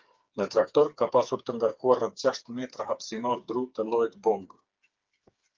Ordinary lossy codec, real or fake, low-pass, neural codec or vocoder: Opus, 24 kbps; fake; 7.2 kHz; codec, 16 kHz, 4 kbps, FreqCodec, smaller model